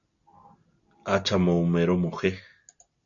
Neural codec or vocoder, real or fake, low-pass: none; real; 7.2 kHz